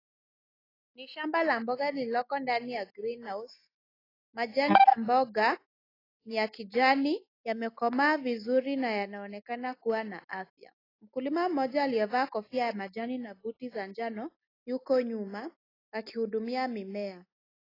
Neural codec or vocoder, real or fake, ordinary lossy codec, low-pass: none; real; AAC, 24 kbps; 5.4 kHz